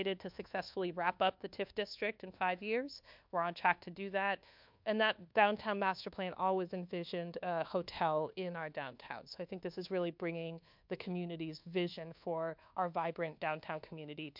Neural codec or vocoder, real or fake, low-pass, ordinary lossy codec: codec, 24 kHz, 1.2 kbps, DualCodec; fake; 5.4 kHz; MP3, 48 kbps